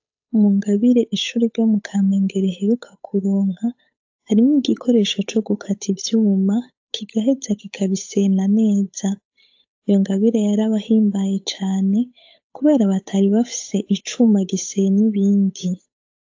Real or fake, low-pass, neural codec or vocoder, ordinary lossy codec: fake; 7.2 kHz; codec, 16 kHz, 8 kbps, FunCodec, trained on Chinese and English, 25 frames a second; AAC, 48 kbps